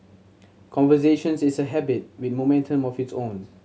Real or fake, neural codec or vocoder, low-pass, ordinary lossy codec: real; none; none; none